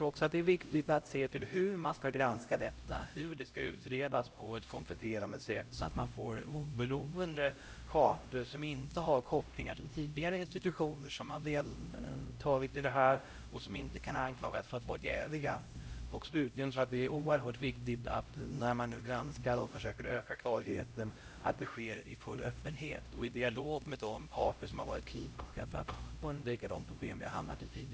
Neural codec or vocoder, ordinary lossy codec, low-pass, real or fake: codec, 16 kHz, 0.5 kbps, X-Codec, HuBERT features, trained on LibriSpeech; none; none; fake